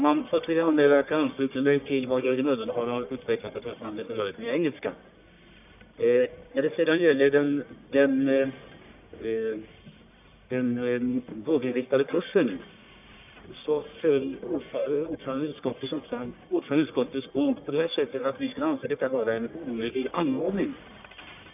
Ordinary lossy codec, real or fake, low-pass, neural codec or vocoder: none; fake; 3.6 kHz; codec, 44.1 kHz, 1.7 kbps, Pupu-Codec